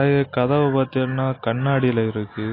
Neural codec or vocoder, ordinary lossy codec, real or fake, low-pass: none; AAC, 24 kbps; real; 5.4 kHz